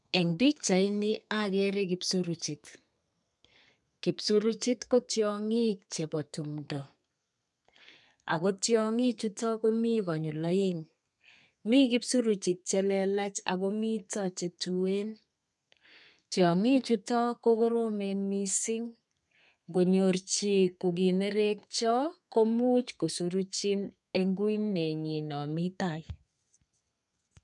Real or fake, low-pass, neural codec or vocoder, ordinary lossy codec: fake; 10.8 kHz; codec, 32 kHz, 1.9 kbps, SNAC; none